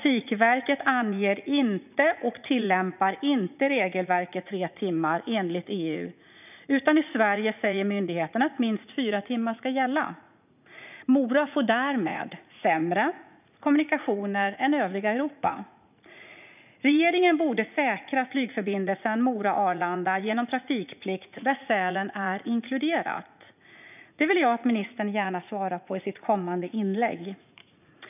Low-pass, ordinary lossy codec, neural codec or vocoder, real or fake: 3.6 kHz; none; vocoder, 44.1 kHz, 80 mel bands, Vocos; fake